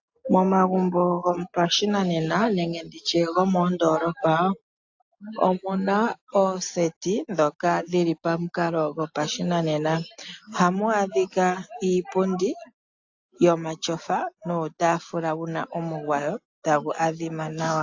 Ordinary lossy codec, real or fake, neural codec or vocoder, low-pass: AAC, 48 kbps; real; none; 7.2 kHz